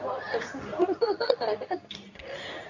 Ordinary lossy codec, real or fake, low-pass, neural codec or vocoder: none; fake; 7.2 kHz; codec, 24 kHz, 0.9 kbps, WavTokenizer, medium speech release version 2